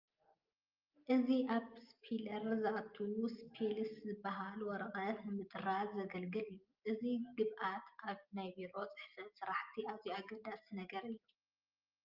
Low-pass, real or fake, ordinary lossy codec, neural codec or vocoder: 5.4 kHz; real; Opus, 24 kbps; none